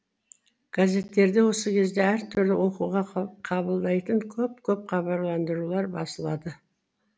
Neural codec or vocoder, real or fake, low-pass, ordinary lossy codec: none; real; none; none